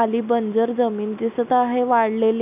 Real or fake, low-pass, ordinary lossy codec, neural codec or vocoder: real; 3.6 kHz; none; none